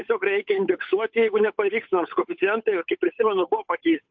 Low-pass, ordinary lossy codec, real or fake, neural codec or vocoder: 7.2 kHz; MP3, 48 kbps; fake; codec, 16 kHz, 8 kbps, FunCodec, trained on Chinese and English, 25 frames a second